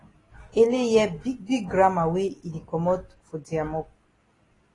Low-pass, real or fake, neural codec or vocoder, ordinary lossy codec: 10.8 kHz; real; none; AAC, 32 kbps